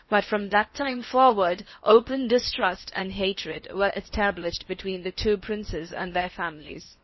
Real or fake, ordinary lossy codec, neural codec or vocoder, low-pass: fake; MP3, 24 kbps; codec, 16 kHz in and 24 kHz out, 0.8 kbps, FocalCodec, streaming, 65536 codes; 7.2 kHz